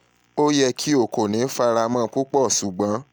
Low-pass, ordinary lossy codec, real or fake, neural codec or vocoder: none; none; real; none